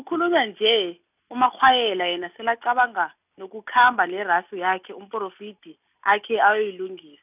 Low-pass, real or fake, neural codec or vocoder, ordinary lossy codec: 3.6 kHz; real; none; none